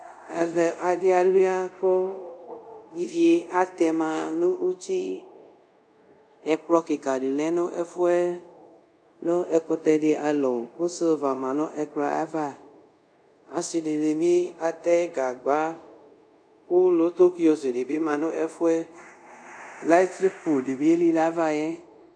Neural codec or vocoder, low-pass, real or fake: codec, 24 kHz, 0.5 kbps, DualCodec; 9.9 kHz; fake